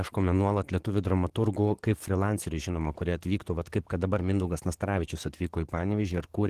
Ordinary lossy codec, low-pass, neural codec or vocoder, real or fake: Opus, 16 kbps; 14.4 kHz; codec, 44.1 kHz, 7.8 kbps, DAC; fake